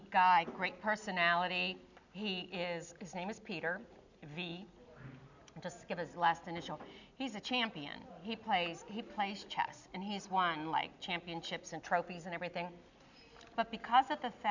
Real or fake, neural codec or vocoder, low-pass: real; none; 7.2 kHz